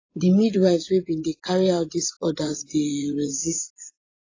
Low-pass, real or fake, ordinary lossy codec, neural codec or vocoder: 7.2 kHz; fake; AAC, 32 kbps; vocoder, 24 kHz, 100 mel bands, Vocos